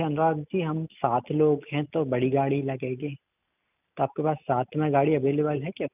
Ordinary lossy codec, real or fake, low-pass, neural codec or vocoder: none; real; 3.6 kHz; none